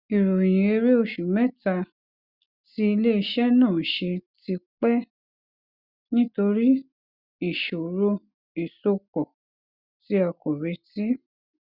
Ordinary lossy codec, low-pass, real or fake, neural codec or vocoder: Opus, 64 kbps; 5.4 kHz; real; none